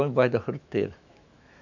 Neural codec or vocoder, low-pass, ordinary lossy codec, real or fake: autoencoder, 48 kHz, 128 numbers a frame, DAC-VAE, trained on Japanese speech; 7.2 kHz; none; fake